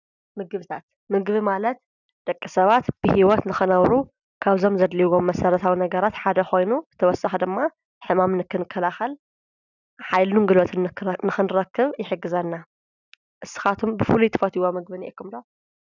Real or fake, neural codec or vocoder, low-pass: real; none; 7.2 kHz